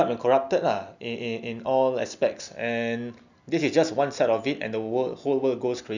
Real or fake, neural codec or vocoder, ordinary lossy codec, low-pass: real; none; none; 7.2 kHz